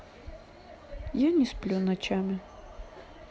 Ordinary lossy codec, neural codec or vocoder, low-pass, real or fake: none; none; none; real